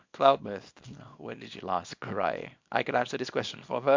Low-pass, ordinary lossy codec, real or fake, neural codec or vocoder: 7.2 kHz; MP3, 64 kbps; fake; codec, 24 kHz, 0.9 kbps, WavTokenizer, small release